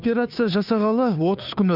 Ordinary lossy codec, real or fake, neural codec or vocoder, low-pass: none; fake; codec, 16 kHz, 2 kbps, FunCodec, trained on Chinese and English, 25 frames a second; 5.4 kHz